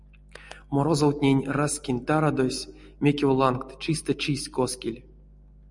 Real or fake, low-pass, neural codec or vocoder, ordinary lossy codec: real; 10.8 kHz; none; MP3, 96 kbps